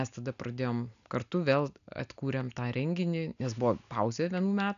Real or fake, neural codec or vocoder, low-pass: real; none; 7.2 kHz